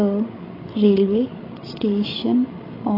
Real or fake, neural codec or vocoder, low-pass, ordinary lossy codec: fake; codec, 16 kHz, 8 kbps, FreqCodec, larger model; 5.4 kHz; AAC, 24 kbps